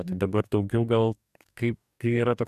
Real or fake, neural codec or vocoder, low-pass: fake; codec, 44.1 kHz, 2.6 kbps, DAC; 14.4 kHz